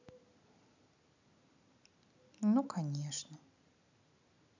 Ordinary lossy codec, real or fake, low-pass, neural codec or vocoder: none; real; 7.2 kHz; none